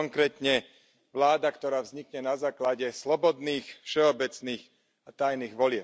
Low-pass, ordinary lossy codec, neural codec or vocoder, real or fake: none; none; none; real